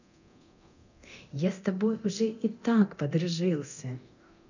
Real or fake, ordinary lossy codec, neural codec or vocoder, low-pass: fake; none; codec, 24 kHz, 0.9 kbps, DualCodec; 7.2 kHz